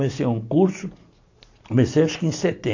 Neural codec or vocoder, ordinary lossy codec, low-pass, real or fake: none; AAC, 48 kbps; 7.2 kHz; real